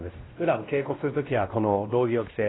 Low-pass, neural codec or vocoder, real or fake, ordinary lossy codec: 7.2 kHz; codec, 16 kHz, 1 kbps, X-Codec, WavLM features, trained on Multilingual LibriSpeech; fake; AAC, 16 kbps